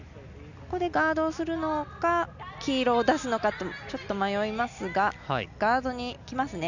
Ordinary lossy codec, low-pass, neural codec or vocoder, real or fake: none; 7.2 kHz; none; real